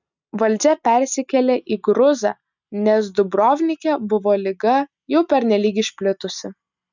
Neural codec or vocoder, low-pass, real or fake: none; 7.2 kHz; real